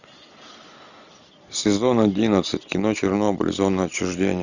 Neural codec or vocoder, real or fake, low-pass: none; real; 7.2 kHz